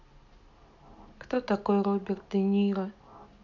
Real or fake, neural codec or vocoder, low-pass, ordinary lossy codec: real; none; 7.2 kHz; none